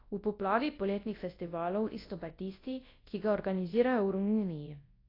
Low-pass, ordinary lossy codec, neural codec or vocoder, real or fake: 5.4 kHz; AAC, 24 kbps; codec, 24 kHz, 0.9 kbps, WavTokenizer, large speech release; fake